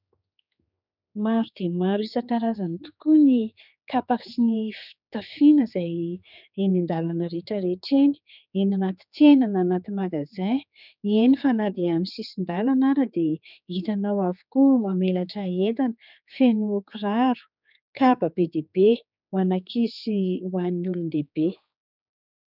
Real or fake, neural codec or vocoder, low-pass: fake; codec, 16 kHz, 4 kbps, X-Codec, HuBERT features, trained on general audio; 5.4 kHz